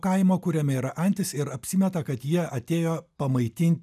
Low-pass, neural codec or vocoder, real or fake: 14.4 kHz; none; real